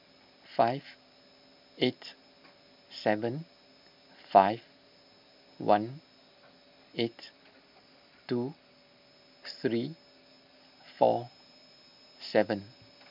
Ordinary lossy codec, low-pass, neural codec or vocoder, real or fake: none; 5.4 kHz; none; real